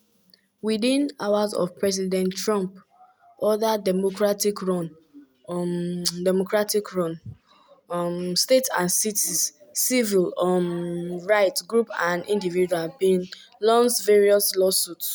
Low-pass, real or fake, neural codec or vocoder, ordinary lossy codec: none; real; none; none